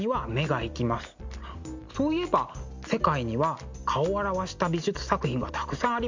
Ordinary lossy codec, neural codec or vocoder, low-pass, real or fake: MP3, 64 kbps; none; 7.2 kHz; real